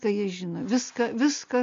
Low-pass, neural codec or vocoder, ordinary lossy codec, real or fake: 7.2 kHz; none; MP3, 48 kbps; real